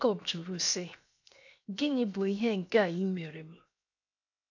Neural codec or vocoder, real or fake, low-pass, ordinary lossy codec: codec, 16 kHz, 0.7 kbps, FocalCodec; fake; 7.2 kHz; none